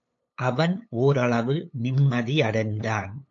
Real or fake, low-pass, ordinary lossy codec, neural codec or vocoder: fake; 7.2 kHz; AAC, 48 kbps; codec, 16 kHz, 8 kbps, FunCodec, trained on LibriTTS, 25 frames a second